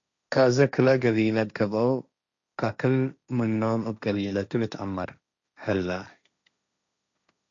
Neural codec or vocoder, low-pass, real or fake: codec, 16 kHz, 1.1 kbps, Voila-Tokenizer; 7.2 kHz; fake